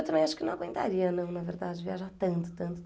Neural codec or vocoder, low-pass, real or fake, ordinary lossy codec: none; none; real; none